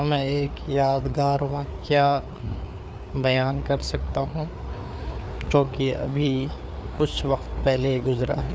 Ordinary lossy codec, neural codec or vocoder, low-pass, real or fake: none; codec, 16 kHz, 4 kbps, FreqCodec, larger model; none; fake